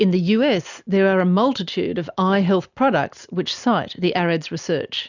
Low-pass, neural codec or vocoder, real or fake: 7.2 kHz; none; real